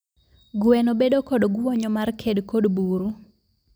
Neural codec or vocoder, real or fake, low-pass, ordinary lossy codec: none; real; none; none